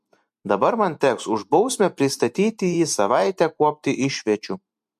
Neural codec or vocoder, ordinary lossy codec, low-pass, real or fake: vocoder, 44.1 kHz, 128 mel bands every 512 samples, BigVGAN v2; MP3, 64 kbps; 14.4 kHz; fake